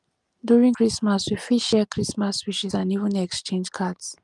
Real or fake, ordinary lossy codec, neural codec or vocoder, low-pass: real; Opus, 32 kbps; none; 10.8 kHz